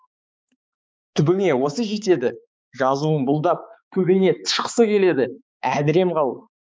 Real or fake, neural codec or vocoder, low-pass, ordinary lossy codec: fake; codec, 16 kHz, 4 kbps, X-Codec, HuBERT features, trained on balanced general audio; none; none